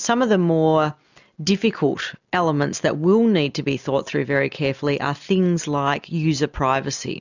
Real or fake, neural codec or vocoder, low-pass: real; none; 7.2 kHz